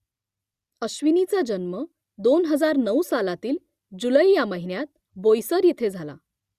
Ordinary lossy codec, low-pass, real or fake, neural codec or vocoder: Opus, 64 kbps; 14.4 kHz; real; none